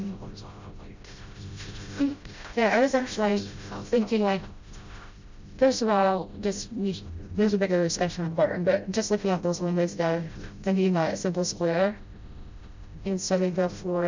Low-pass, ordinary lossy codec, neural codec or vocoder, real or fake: 7.2 kHz; MP3, 64 kbps; codec, 16 kHz, 0.5 kbps, FreqCodec, smaller model; fake